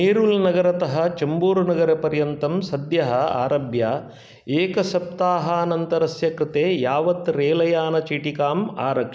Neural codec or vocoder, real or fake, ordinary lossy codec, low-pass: none; real; none; none